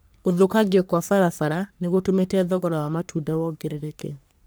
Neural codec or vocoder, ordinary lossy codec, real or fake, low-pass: codec, 44.1 kHz, 3.4 kbps, Pupu-Codec; none; fake; none